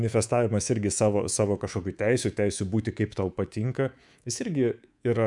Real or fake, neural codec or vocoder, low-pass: fake; codec, 24 kHz, 3.1 kbps, DualCodec; 10.8 kHz